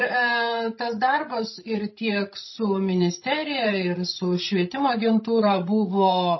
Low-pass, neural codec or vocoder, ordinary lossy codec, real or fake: 7.2 kHz; codec, 16 kHz, 16 kbps, FreqCodec, larger model; MP3, 24 kbps; fake